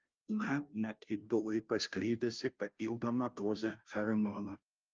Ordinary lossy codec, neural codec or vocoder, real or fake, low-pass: Opus, 24 kbps; codec, 16 kHz, 0.5 kbps, FunCodec, trained on Chinese and English, 25 frames a second; fake; 7.2 kHz